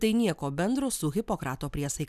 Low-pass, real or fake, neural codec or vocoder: 14.4 kHz; real; none